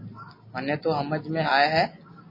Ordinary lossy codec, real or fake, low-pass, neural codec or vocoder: MP3, 24 kbps; real; 5.4 kHz; none